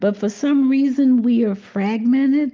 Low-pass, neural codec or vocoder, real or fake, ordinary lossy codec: 7.2 kHz; none; real; Opus, 32 kbps